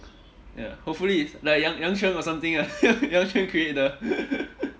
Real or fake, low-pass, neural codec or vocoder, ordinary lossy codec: real; none; none; none